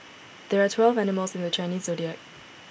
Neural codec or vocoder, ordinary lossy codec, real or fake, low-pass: none; none; real; none